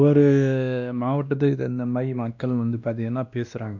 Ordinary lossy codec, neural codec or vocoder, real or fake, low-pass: none; codec, 16 kHz, 1 kbps, X-Codec, WavLM features, trained on Multilingual LibriSpeech; fake; 7.2 kHz